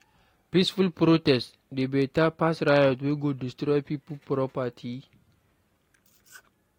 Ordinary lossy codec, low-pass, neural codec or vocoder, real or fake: AAC, 48 kbps; 19.8 kHz; none; real